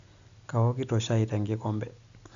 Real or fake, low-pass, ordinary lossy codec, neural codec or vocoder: real; 7.2 kHz; Opus, 64 kbps; none